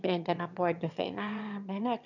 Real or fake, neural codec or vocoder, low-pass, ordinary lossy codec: fake; autoencoder, 22.05 kHz, a latent of 192 numbers a frame, VITS, trained on one speaker; 7.2 kHz; none